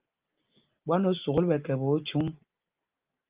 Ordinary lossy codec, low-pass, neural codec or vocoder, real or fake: Opus, 32 kbps; 3.6 kHz; none; real